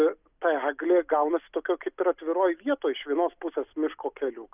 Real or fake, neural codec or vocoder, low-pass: real; none; 3.6 kHz